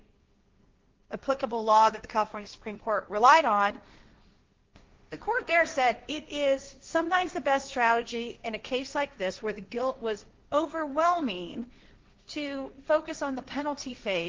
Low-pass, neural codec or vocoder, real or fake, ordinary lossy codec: 7.2 kHz; codec, 16 kHz, 1.1 kbps, Voila-Tokenizer; fake; Opus, 32 kbps